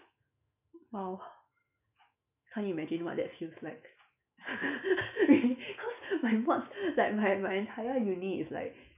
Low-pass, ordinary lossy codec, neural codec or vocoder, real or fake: 3.6 kHz; none; autoencoder, 48 kHz, 128 numbers a frame, DAC-VAE, trained on Japanese speech; fake